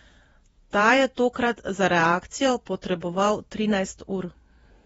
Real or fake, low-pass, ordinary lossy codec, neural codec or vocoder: fake; 19.8 kHz; AAC, 24 kbps; vocoder, 48 kHz, 128 mel bands, Vocos